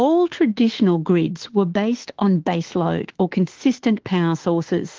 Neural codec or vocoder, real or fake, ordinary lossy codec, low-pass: autoencoder, 48 kHz, 32 numbers a frame, DAC-VAE, trained on Japanese speech; fake; Opus, 16 kbps; 7.2 kHz